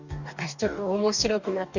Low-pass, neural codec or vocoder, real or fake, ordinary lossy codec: 7.2 kHz; codec, 44.1 kHz, 2.6 kbps, DAC; fake; none